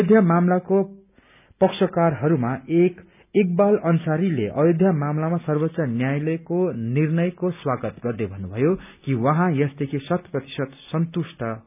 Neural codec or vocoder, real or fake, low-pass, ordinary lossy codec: none; real; 3.6 kHz; none